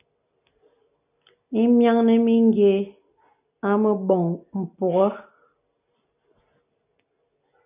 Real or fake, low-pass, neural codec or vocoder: real; 3.6 kHz; none